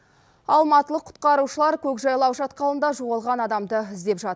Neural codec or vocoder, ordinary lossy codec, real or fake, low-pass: none; none; real; none